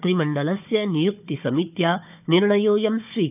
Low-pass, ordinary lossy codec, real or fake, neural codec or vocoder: 3.6 kHz; none; fake; codec, 16 kHz, 4 kbps, FunCodec, trained on Chinese and English, 50 frames a second